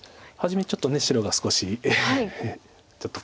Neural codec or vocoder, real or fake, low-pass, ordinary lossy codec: none; real; none; none